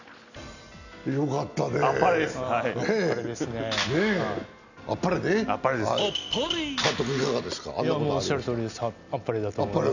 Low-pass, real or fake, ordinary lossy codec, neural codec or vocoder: 7.2 kHz; real; none; none